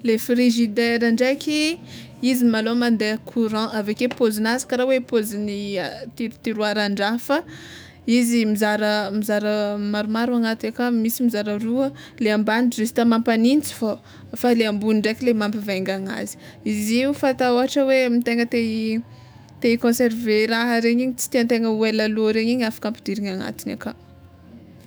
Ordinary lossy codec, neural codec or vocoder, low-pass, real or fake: none; autoencoder, 48 kHz, 128 numbers a frame, DAC-VAE, trained on Japanese speech; none; fake